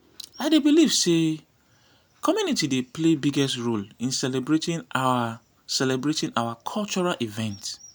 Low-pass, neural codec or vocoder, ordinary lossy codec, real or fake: none; none; none; real